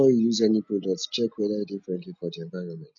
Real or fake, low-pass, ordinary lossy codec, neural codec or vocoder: real; 7.2 kHz; none; none